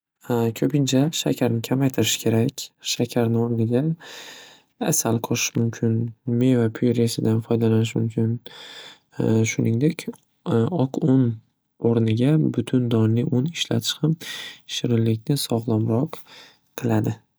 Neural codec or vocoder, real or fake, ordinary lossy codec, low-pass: none; real; none; none